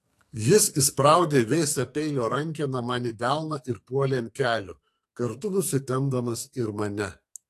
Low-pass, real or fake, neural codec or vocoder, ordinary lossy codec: 14.4 kHz; fake; codec, 44.1 kHz, 2.6 kbps, SNAC; AAC, 64 kbps